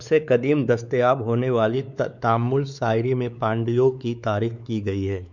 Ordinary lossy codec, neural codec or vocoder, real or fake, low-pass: none; codec, 16 kHz, 4 kbps, X-Codec, WavLM features, trained on Multilingual LibriSpeech; fake; 7.2 kHz